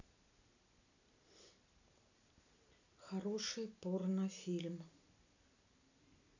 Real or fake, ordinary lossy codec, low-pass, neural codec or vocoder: real; none; 7.2 kHz; none